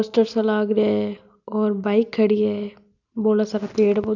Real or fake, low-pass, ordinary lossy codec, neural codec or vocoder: real; 7.2 kHz; none; none